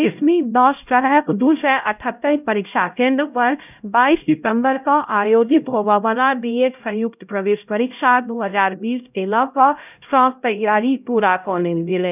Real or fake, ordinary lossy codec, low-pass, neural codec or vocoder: fake; none; 3.6 kHz; codec, 16 kHz, 0.5 kbps, X-Codec, HuBERT features, trained on LibriSpeech